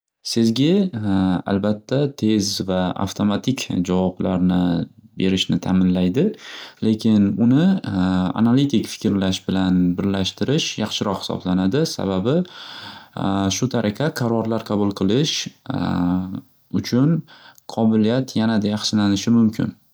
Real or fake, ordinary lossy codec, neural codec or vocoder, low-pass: real; none; none; none